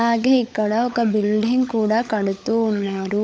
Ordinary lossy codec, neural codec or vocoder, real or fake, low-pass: none; codec, 16 kHz, 16 kbps, FunCodec, trained on LibriTTS, 50 frames a second; fake; none